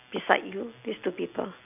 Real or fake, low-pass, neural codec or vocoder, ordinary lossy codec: real; 3.6 kHz; none; none